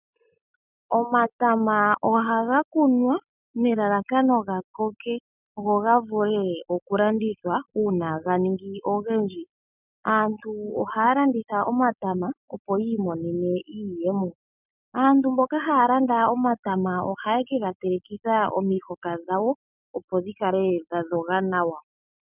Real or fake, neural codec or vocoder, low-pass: real; none; 3.6 kHz